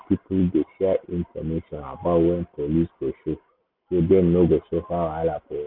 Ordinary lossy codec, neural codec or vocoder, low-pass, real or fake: none; none; 5.4 kHz; real